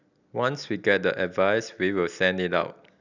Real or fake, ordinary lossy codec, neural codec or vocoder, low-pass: real; none; none; 7.2 kHz